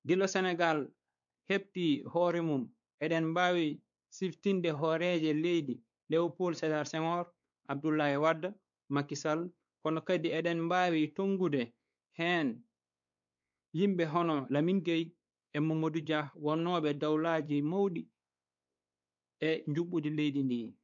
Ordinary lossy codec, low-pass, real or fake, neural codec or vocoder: none; 7.2 kHz; fake; codec, 16 kHz, 4 kbps, X-Codec, WavLM features, trained on Multilingual LibriSpeech